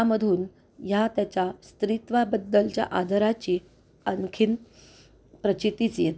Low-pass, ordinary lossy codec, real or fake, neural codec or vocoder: none; none; real; none